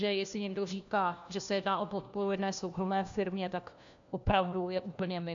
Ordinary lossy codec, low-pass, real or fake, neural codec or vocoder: MP3, 64 kbps; 7.2 kHz; fake; codec, 16 kHz, 1 kbps, FunCodec, trained on LibriTTS, 50 frames a second